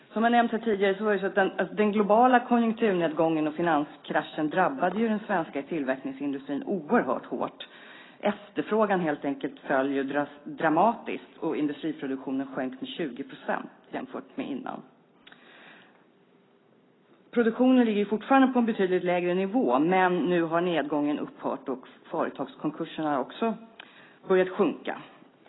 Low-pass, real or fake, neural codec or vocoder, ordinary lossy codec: 7.2 kHz; real; none; AAC, 16 kbps